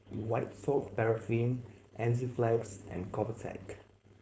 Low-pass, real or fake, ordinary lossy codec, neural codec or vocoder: none; fake; none; codec, 16 kHz, 4.8 kbps, FACodec